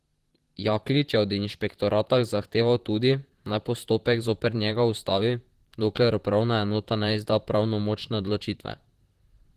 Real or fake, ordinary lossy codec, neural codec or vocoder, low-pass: fake; Opus, 16 kbps; vocoder, 44.1 kHz, 128 mel bands, Pupu-Vocoder; 14.4 kHz